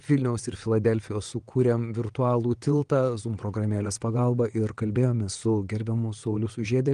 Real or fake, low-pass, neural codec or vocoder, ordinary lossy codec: fake; 9.9 kHz; vocoder, 22.05 kHz, 80 mel bands, WaveNeXt; Opus, 24 kbps